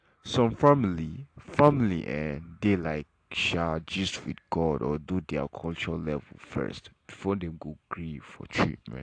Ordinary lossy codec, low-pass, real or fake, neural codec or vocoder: AAC, 48 kbps; 9.9 kHz; real; none